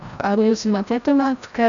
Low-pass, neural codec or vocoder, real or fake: 7.2 kHz; codec, 16 kHz, 0.5 kbps, FreqCodec, larger model; fake